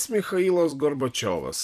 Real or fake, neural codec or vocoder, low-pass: fake; vocoder, 44.1 kHz, 128 mel bands every 256 samples, BigVGAN v2; 14.4 kHz